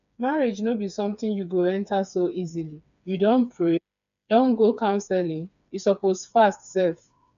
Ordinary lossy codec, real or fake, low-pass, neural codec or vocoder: none; fake; 7.2 kHz; codec, 16 kHz, 4 kbps, FreqCodec, smaller model